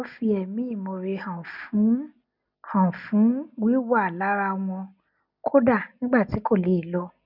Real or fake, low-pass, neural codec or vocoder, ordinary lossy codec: real; 5.4 kHz; none; none